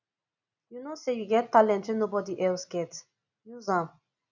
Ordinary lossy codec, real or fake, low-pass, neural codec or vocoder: none; real; 7.2 kHz; none